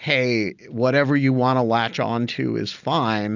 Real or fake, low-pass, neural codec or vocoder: real; 7.2 kHz; none